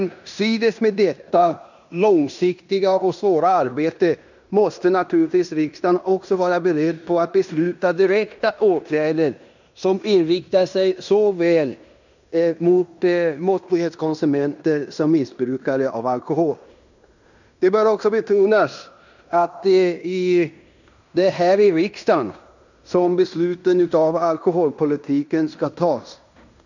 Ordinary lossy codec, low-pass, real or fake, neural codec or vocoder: none; 7.2 kHz; fake; codec, 16 kHz in and 24 kHz out, 0.9 kbps, LongCat-Audio-Codec, fine tuned four codebook decoder